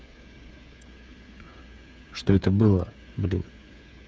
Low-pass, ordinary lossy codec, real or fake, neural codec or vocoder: none; none; fake; codec, 16 kHz, 8 kbps, FreqCodec, smaller model